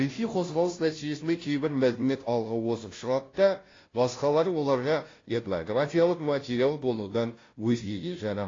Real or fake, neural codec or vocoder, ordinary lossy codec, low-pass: fake; codec, 16 kHz, 0.5 kbps, FunCodec, trained on Chinese and English, 25 frames a second; AAC, 32 kbps; 7.2 kHz